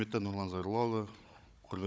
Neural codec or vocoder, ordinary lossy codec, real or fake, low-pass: codec, 16 kHz, 16 kbps, FunCodec, trained on Chinese and English, 50 frames a second; none; fake; none